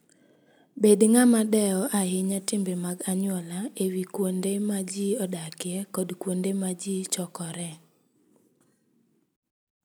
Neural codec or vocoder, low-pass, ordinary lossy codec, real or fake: none; none; none; real